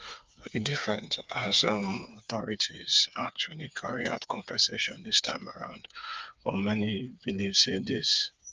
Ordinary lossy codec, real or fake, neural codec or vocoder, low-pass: Opus, 24 kbps; fake; codec, 16 kHz, 4 kbps, FreqCodec, smaller model; 7.2 kHz